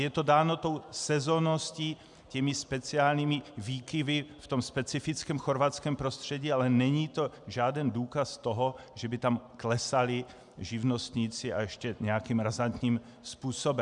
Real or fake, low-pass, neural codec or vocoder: real; 10.8 kHz; none